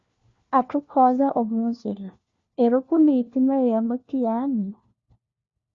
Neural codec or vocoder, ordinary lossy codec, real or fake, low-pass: codec, 16 kHz, 1 kbps, FunCodec, trained on LibriTTS, 50 frames a second; Opus, 64 kbps; fake; 7.2 kHz